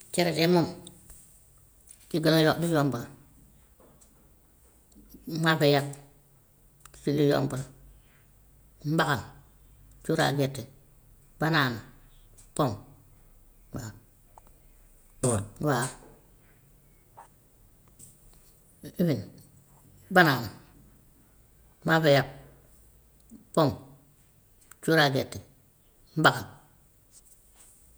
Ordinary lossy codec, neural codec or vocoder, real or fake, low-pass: none; vocoder, 48 kHz, 128 mel bands, Vocos; fake; none